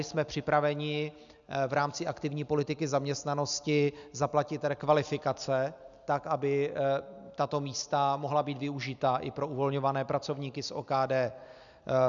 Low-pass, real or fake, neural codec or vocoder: 7.2 kHz; real; none